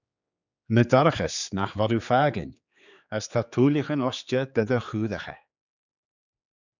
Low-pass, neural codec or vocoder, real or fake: 7.2 kHz; codec, 16 kHz, 4 kbps, X-Codec, HuBERT features, trained on general audio; fake